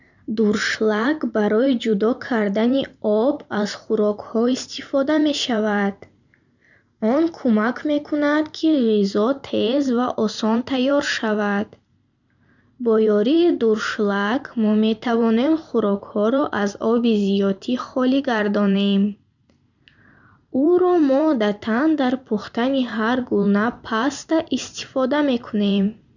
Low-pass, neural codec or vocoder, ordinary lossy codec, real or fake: 7.2 kHz; vocoder, 44.1 kHz, 128 mel bands every 512 samples, BigVGAN v2; none; fake